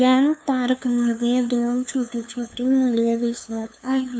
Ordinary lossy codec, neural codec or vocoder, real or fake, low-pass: none; codec, 16 kHz, 2 kbps, FunCodec, trained on LibriTTS, 25 frames a second; fake; none